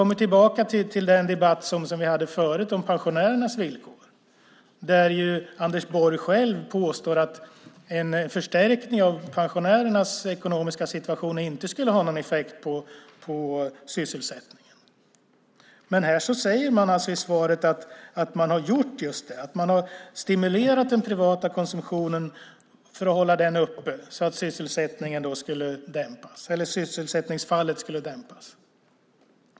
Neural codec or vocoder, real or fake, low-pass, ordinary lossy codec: none; real; none; none